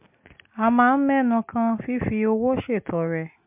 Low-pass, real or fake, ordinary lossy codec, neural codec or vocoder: 3.6 kHz; real; MP3, 32 kbps; none